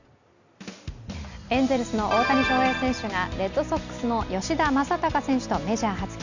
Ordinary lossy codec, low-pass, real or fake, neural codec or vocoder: none; 7.2 kHz; real; none